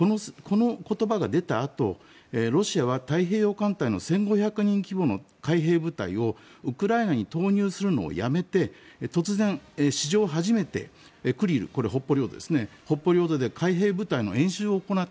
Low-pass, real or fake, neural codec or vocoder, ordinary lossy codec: none; real; none; none